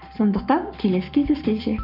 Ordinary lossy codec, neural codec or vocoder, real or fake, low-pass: none; codec, 44.1 kHz, 7.8 kbps, Pupu-Codec; fake; 5.4 kHz